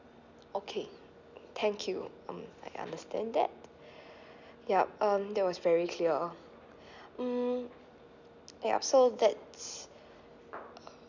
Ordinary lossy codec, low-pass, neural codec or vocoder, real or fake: none; 7.2 kHz; none; real